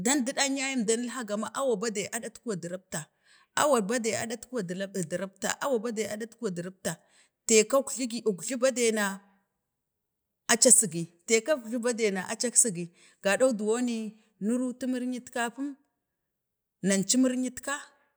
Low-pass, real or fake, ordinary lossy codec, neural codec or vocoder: none; real; none; none